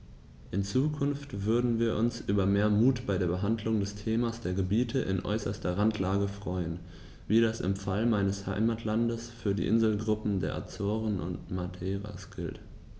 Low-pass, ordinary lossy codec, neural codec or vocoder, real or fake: none; none; none; real